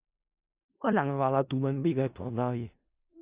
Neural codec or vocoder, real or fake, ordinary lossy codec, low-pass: codec, 16 kHz in and 24 kHz out, 0.4 kbps, LongCat-Audio-Codec, four codebook decoder; fake; Opus, 64 kbps; 3.6 kHz